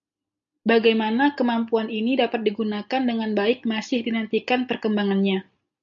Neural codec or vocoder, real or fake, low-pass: none; real; 7.2 kHz